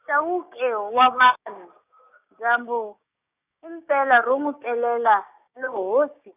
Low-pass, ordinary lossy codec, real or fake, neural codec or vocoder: 3.6 kHz; none; fake; codec, 16 kHz, 6 kbps, DAC